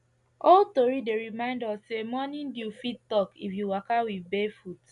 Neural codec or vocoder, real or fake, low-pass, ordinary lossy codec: none; real; 10.8 kHz; none